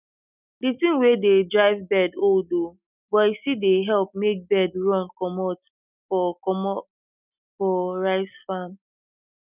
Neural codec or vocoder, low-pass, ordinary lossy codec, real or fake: none; 3.6 kHz; none; real